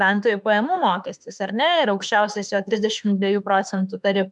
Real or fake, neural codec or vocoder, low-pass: fake; autoencoder, 48 kHz, 32 numbers a frame, DAC-VAE, trained on Japanese speech; 10.8 kHz